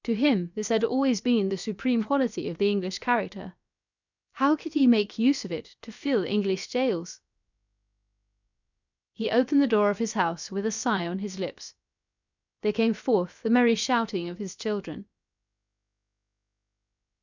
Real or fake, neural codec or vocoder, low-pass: fake; codec, 16 kHz, about 1 kbps, DyCAST, with the encoder's durations; 7.2 kHz